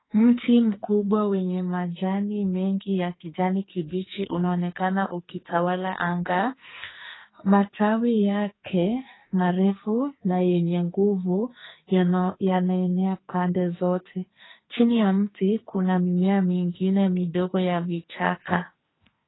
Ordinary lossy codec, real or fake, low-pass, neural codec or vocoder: AAC, 16 kbps; fake; 7.2 kHz; codec, 44.1 kHz, 2.6 kbps, SNAC